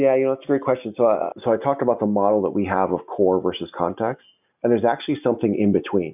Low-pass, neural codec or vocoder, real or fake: 3.6 kHz; none; real